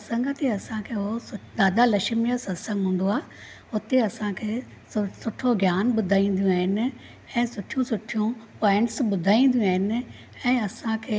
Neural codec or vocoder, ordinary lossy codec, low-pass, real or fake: none; none; none; real